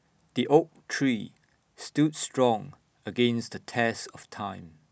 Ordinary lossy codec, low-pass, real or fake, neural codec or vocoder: none; none; real; none